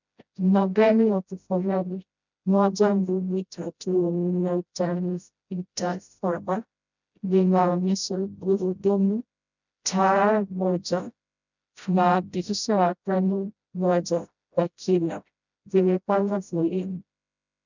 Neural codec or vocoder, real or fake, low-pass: codec, 16 kHz, 0.5 kbps, FreqCodec, smaller model; fake; 7.2 kHz